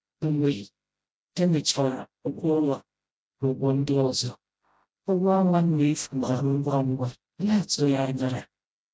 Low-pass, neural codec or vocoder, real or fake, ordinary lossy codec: none; codec, 16 kHz, 0.5 kbps, FreqCodec, smaller model; fake; none